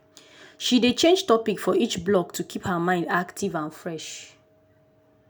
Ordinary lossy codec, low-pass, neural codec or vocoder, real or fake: none; none; none; real